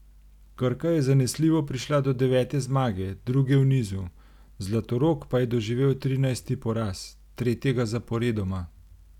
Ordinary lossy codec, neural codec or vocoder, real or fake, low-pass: none; none; real; 19.8 kHz